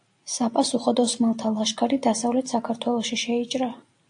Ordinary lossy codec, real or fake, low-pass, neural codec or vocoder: AAC, 64 kbps; real; 9.9 kHz; none